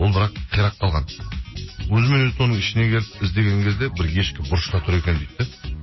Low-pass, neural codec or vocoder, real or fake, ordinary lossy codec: 7.2 kHz; none; real; MP3, 24 kbps